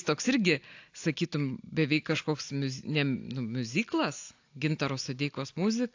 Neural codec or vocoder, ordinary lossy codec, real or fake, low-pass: none; AAC, 48 kbps; real; 7.2 kHz